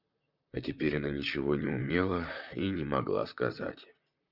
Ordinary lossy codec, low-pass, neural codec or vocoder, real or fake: Opus, 64 kbps; 5.4 kHz; vocoder, 44.1 kHz, 128 mel bands, Pupu-Vocoder; fake